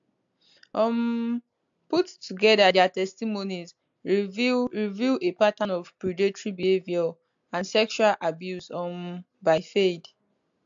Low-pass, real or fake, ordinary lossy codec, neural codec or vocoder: 7.2 kHz; real; AAC, 64 kbps; none